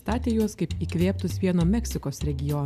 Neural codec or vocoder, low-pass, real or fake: none; 14.4 kHz; real